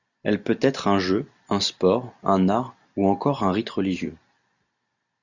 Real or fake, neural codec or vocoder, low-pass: real; none; 7.2 kHz